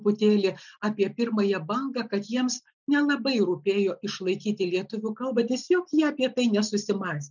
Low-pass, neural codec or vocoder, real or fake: 7.2 kHz; none; real